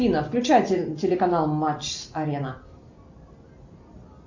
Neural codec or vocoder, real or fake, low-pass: none; real; 7.2 kHz